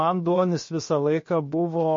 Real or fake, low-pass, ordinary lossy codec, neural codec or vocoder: fake; 7.2 kHz; MP3, 32 kbps; codec, 16 kHz, 0.7 kbps, FocalCodec